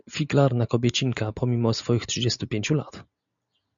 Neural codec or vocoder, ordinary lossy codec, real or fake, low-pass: none; MP3, 96 kbps; real; 7.2 kHz